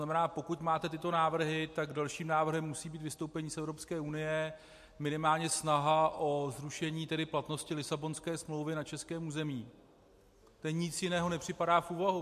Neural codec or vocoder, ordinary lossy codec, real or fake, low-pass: none; MP3, 64 kbps; real; 14.4 kHz